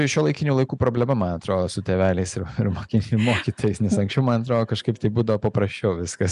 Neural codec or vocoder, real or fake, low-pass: none; real; 10.8 kHz